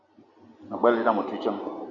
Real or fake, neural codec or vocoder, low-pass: real; none; 7.2 kHz